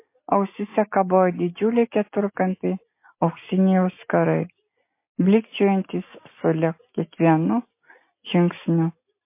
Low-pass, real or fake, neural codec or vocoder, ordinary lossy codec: 3.6 kHz; real; none; MP3, 24 kbps